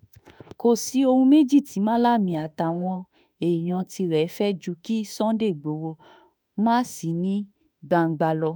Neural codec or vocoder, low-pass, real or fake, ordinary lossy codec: autoencoder, 48 kHz, 32 numbers a frame, DAC-VAE, trained on Japanese speech; none; fake; none